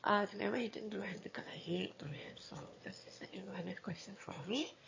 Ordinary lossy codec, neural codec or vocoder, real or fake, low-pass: MP3, 32 kbps; autoencoder, 22.05 kHz, a latent of 192 numbers a frame, VITS, trained on one speaker; fake; 7.2 kHz